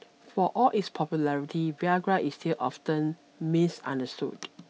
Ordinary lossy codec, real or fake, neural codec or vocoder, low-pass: none; real; none; none